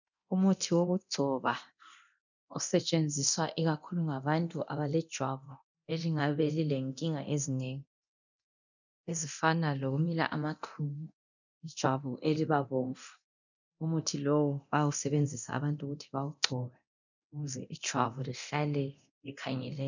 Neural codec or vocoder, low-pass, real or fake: codec, 24 kHz, 0.9 kbps, DualCodec; 7.2 kHz; fake